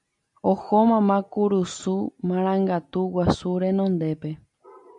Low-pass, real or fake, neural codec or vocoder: 10.8 kHz; real; none